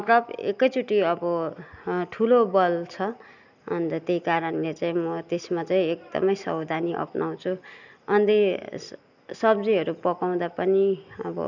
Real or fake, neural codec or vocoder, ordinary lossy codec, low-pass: real; none; none; 7.2 kHz